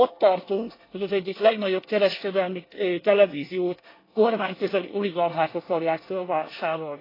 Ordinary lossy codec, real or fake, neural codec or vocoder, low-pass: AAC, 24 kbps; fake; codec, 24 kHz, 1 kbps, SNAC; 5.4 kHz